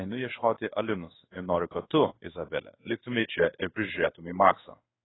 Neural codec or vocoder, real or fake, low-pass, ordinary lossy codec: codec, 16 kHz in and 24 kHz out, 1 kbps, XY-Tokenizer; fake; 7.2 kHz; AAC, 16 kbps